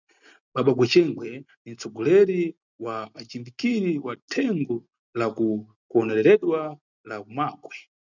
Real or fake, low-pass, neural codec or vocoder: real; 7.2 kHz; none